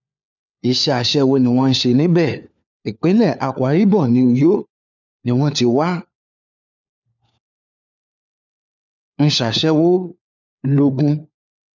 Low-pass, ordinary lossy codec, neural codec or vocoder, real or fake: 7.2 kHz; none; codec, 16 kHz, 4 kbps, FunCodec, trained on LibriTTS, 50 frames a second; fake